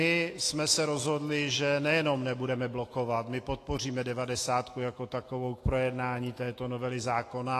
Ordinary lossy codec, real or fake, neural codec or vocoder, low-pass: AAC, 48 kbps; fake; autoencoder, 48 kHz, 128 numbers a frame, DAC-VAE, trained on Japanese speech; 14.4 kHz